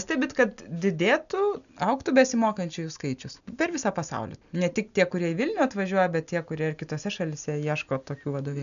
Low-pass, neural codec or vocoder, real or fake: 7.2 kHz; none; real